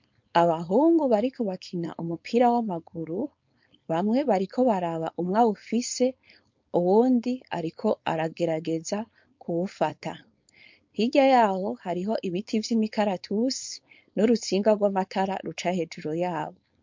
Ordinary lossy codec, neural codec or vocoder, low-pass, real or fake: MP3, 48 kbps; codec, 16 kHz, 4.8 kbps, FACodec; 7.2 kHz; fake